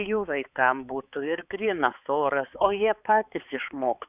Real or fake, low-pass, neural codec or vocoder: fake; 3.6 kHz; codec, 16 kHz, 4 kbps, X-Codec, HuBERT features, trained on balanced general audio